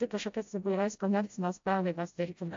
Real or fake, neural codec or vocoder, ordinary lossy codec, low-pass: fake; codec, 16 kHz, 0.5 kbps, FreqCodec, smaller model; AAC, 48 kbps; 7.2 kHz